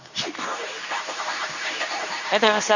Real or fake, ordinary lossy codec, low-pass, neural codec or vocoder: fake; none; 7.2 kHz; codec, 24 kHz, 0.9 kbps, WavTokenizer, medium speech release version 2